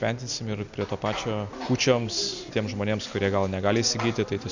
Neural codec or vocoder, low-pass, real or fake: none; 7.2 kHz; real